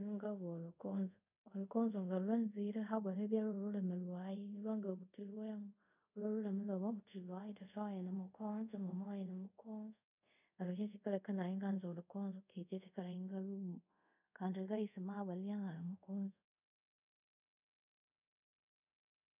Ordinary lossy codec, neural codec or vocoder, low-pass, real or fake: none; codec, 24 kHz, 0.5 kbps, DualCodec; 3.6 kHz; fake